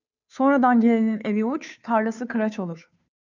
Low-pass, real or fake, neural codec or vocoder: 7.2 kHz; fake; codec, 16 kHz, 2 kbps, FunCodec, trained on Chinese and English, 25 frames a second